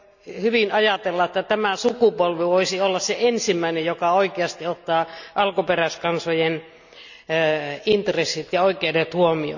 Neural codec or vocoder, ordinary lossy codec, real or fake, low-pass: none; none; real; 7.2 kHz